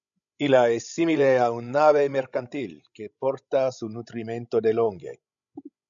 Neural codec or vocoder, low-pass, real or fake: codec, 16 kHz, 16 kbps, FreqCodec, larger model; 7.2 kHz; fake